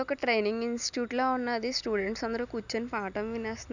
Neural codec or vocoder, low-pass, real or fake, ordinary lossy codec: none; 7.2 kHz; real; none